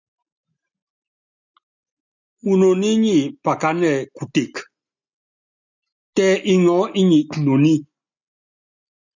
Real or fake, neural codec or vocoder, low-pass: real; none; 7.2 kHz